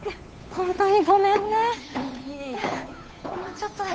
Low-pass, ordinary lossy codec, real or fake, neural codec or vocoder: none; none; fake; codec, 16 kHz, 8 kbps, FunCodec, trained on Chinese and English, 25 frames a second